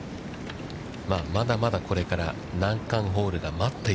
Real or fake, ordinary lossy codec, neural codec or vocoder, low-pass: real; none; none; none